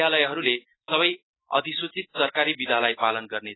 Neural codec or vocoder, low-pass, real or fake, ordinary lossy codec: none; 7.2 kHz; real; AAC, 16 kbps